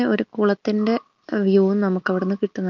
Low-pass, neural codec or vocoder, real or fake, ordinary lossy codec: 7.2 kHz; none; real; Opus, 32 kbps